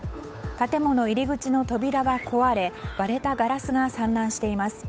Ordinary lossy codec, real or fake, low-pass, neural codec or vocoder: none; fake; none; codec, 16 kHz, 8 kbps, FunCodec, trained on Chinese and English, 25 frames a second